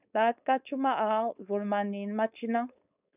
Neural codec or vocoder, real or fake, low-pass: codec, 16 kHz, 4.8 kbps, FACodec; fake; 3.6 kHz